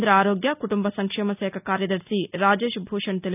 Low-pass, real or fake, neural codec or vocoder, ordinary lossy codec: 3.6 kHz; real; none; none